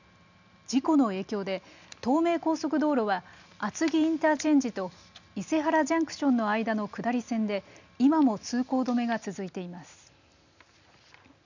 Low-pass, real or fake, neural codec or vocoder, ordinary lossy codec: 7.2 kHz; real; none; none